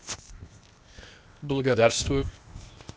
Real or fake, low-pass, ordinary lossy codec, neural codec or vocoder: fake; none; none; codec, 16 kHz, 0.8 kbps, ZipCodec